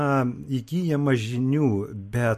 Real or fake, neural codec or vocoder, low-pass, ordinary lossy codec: real; none; 14.4 kHz; MP3, 64 kbps